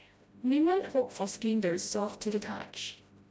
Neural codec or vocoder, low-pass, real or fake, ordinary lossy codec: codec, 16 kHz, 0.5 kbps, FreqCodec, smaller model; none; fake; none